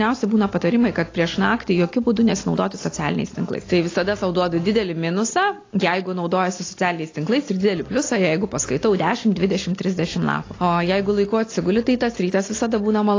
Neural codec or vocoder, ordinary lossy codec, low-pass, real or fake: none; AAC, 32 kbps; 7.2 kHz; real